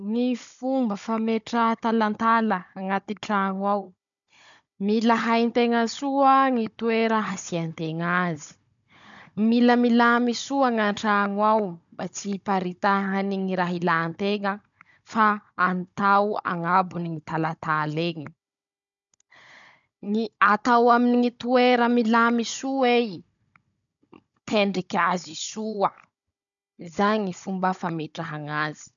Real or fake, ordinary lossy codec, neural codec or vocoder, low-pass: fake; none; codec, 16 kHz, 16 kbps, FunCodec, trained on Chinese and English, 50 frames a second; 7.2 kHz